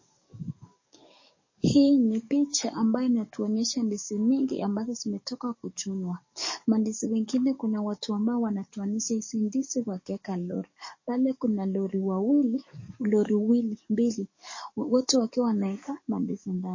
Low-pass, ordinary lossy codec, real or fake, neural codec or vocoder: 7.2 kHz; MP3, 32 kbps; fake; codec, 44.1 kHz, 7.8 kbps, DAC